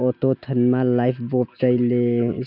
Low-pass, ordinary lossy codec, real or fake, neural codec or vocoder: 5.4 kHz; none; real; none